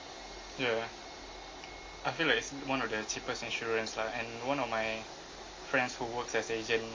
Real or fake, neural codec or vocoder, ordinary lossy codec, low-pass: real; none; MP3, 32 kbps; 7.2 kHz